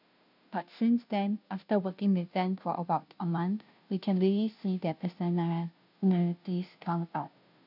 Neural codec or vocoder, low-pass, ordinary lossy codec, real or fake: codec, 16 kHz, 0.5 kbps, FunCodec, trained on Chinese and English, 25 frames a second; 5.4 kHz; none; fake